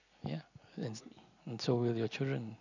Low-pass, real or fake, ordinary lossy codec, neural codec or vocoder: 7.2 kHz; real; none; none